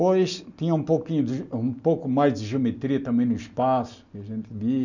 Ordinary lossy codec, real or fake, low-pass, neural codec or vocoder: none; real; 7.2 kHz; none